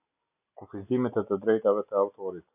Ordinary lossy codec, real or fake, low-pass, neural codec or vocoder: AAC, 32 kbps; real; 3.6 kHz; none